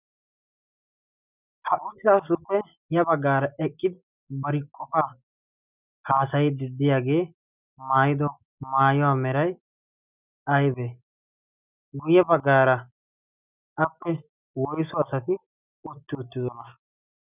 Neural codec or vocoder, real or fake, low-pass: none; real; 3.6 kHz